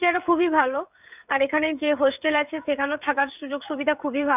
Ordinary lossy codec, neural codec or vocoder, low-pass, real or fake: none; codec, 16 kHz, 16 kbps, FreqCodec, smaller model; 3.6 kHz; fake